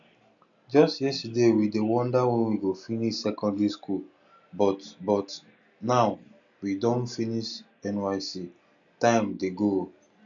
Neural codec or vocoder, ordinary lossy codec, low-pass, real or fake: none; none; 7.2 kHz; real